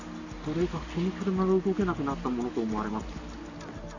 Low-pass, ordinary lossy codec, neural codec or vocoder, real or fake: 7.2 kHz; none; none; real